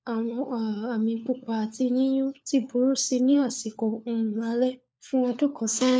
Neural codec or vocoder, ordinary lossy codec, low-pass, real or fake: codec, 16 kHz, 4 kbps, FunCodec, trained on LibriTTS, 50 frames a second; none; none; fake